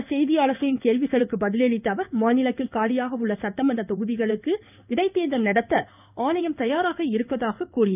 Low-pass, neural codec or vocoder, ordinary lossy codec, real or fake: 3.6 kHz; codec, 16 kHz in and 24 kHz out, 1 kbps, XY-Tokenizer; none; fake